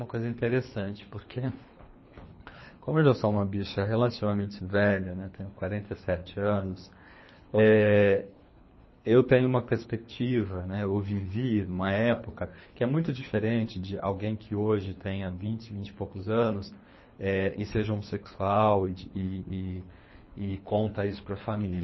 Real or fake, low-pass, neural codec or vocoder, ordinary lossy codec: fake; 7.2 kHz; codec, 24 kHz, 3 kbps, HILCodec; MP3, 24 kbps